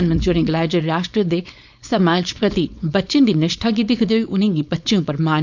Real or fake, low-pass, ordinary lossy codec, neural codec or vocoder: fake; 7.2 kHz; none; codec, 16 kHz, 4.8 kbps, FACodec